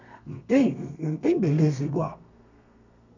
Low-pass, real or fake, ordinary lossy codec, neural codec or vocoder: 7.2 kHz; fake; none; codec, 32 kHz, 1.9 kbps, SNAC